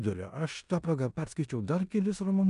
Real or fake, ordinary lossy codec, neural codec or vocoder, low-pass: fake; MP3, 96 kbps; codec, 16 kHz in and 24 kHz out, 0.9 kbps, LongCat-Audio-Codec, four codebook decoder; 10.8 kHz